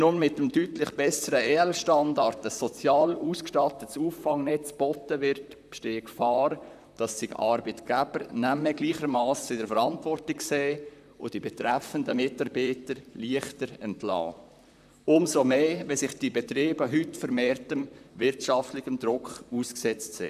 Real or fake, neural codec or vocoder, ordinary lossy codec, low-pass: fake; vocoder, 44.1 kHz, 128 mel bands, Pupu-Vocoder; none; 14.4 kHz